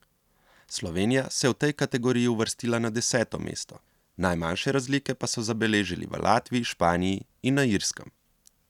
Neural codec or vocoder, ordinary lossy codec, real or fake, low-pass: none; none; real; 19.8 kHz